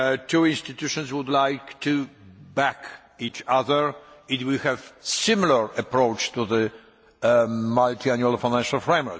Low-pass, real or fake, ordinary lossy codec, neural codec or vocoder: none; real; none; none